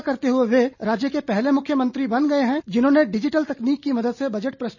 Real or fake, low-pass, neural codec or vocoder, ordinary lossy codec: real; 7.2 kHz; none; none